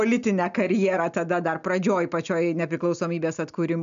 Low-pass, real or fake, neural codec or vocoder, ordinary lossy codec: 7.2 kHz; real; none; AAC, 96 kbps